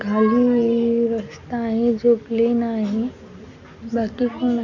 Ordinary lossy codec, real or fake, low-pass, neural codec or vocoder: none; real; 7.2 kHz; none